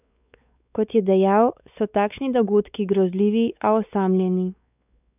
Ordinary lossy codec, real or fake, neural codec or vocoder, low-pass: none; fake; codec, 16 kHz, 4 kbps, X-Codec, WavLM features, trained on Multilingual LibriSpeech; 3.6 kHz